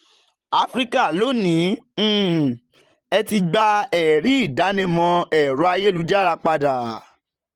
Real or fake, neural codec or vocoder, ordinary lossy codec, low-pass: fake; vocoder, 44.1 kHz, 128 mel bands, Pupu-Vocoder; Opus, 24 kbps; 19.8 kHz